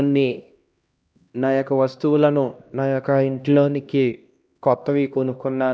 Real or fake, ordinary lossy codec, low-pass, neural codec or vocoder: fake; none; none; codec, 16 kHz, 1 kbps, X-Codec, HuBERT features, trained on LibriSpeech